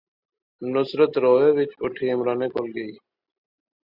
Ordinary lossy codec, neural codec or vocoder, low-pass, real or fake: Opus, 64 kbps; none; 5.4 kHz; real